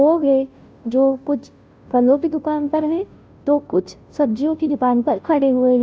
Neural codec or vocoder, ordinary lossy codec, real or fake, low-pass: codec, 16 kHz, 0.5 kbps, FunCodec, trained on Chinese and English, 25 frames a second; none; fake; none